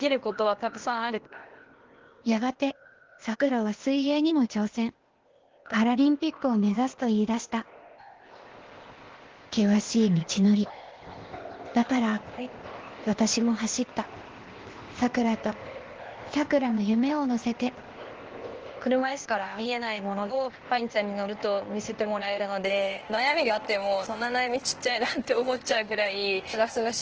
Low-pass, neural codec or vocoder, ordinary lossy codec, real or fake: 7.2 kHz; codec, 16 kHz, 0.8 kbps, ZipCodec; Opus, 16 kbps; fake